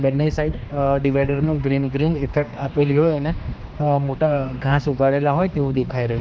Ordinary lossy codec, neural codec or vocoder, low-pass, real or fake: none; codec, 16 kHz, 2 kbps, X-Codec, HuBERT features, trained on general audio; none; fake